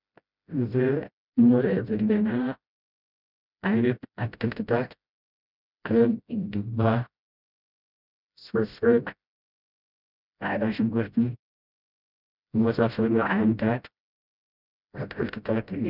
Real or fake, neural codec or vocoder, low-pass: fake; codec, 16 kHz, 0.5 kbps, FreqCodec, smaller model; 5.4 kHz